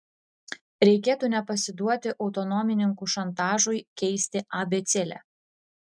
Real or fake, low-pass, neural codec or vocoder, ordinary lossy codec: real; 9.9 kHz; none; MP3, 96 kbps